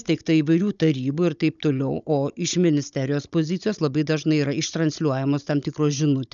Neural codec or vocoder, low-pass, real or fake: codec, 16 kHz, 16 kbps, FunCodec, trained on Chinese and English, 50 frames a second; 7.2 kHz; fake